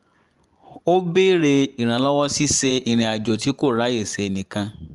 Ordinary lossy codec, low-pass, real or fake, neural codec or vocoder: Opus, 32 kbps; 10.8 kHz; fake; vocoder, 24 kHz, 100 mel bands, Vocos